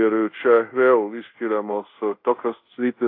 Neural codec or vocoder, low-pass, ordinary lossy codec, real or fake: codec, 24 kHz, 0.5 kbps, DualCodec; 5.4 kHz; AAC, 32 kbps; fake